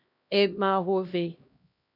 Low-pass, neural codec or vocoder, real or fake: 5.4 kHz; codec, 16 kHz, 1 kbps, X-Codec, HuBERT features, trained on LibriSpeech; fake